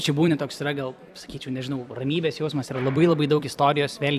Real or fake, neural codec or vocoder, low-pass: fake; vocoder, 44.1 kHz, 128 mel bands every 256 samples, BigVGAN v2; 14.4 kHz